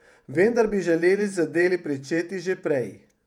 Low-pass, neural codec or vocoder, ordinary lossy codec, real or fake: 19.8 kHz; vocoder, 48 kHz, 128 mel bands, Vocos; none; fake